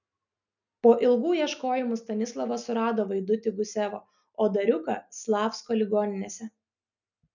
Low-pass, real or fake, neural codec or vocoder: 7.2 kHz; real; none